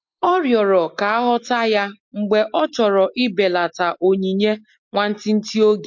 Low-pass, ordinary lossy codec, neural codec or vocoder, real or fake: 7.2 kHz; MP3, 48 kbps; none; real